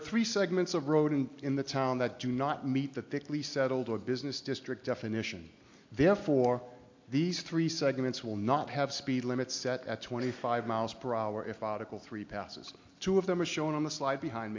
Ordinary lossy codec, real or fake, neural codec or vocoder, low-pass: MP3, 48 kbps; real; none; 7.2 kHz